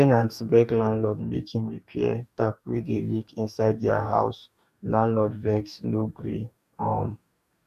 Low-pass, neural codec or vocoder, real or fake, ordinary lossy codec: 14.4 kHz; codec, 44.1 kHz, 2.6 kbps, DAC; fake; none